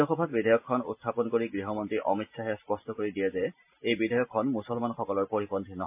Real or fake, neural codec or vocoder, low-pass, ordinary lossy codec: real; none; 3.6 kHz; MP3, 32 kbps